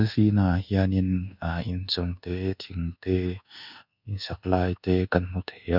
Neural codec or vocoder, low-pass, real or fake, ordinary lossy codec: codec, 24 kHz, 1.2 kbps, DualCodec; 5.4 kHz; fake; none